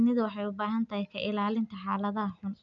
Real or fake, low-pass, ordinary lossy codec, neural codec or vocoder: real; 7.2 kHz; none; none